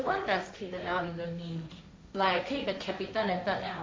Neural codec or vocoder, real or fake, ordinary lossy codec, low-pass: codec, 16 kHz, 1.1 kbps, Voila-Tokenizer; fake; none; none